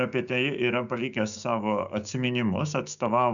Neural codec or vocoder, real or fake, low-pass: codec, 16 kHz, 6 kbps, DAC; fake; 7.2 kHz